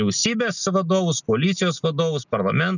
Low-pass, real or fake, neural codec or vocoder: 7.2 kHz; real; none